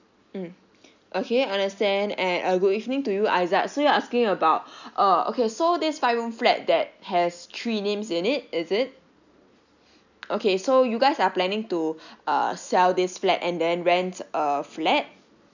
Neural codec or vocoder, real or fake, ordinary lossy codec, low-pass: none; real; none; 7.2 kHz